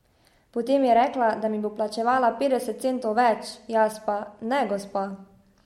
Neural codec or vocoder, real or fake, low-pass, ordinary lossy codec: none; real; 19.8 kHz; MP3, 64 kbps